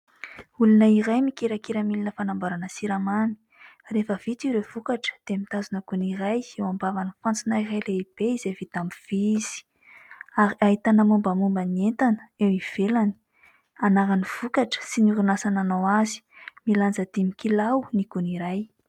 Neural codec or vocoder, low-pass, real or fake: none; 19.8 kHz; real